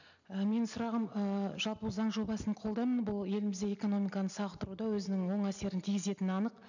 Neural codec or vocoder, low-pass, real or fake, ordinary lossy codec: none; 7.2 kHz; real; none